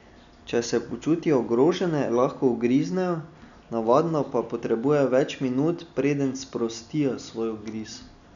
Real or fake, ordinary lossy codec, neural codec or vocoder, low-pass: real; none; none; 7.2 kHz